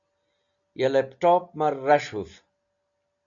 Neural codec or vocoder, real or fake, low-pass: none; real; 7.2 kHz